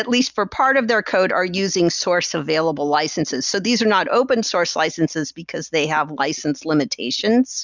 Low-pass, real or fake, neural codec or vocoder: 7.2 kHz; real; none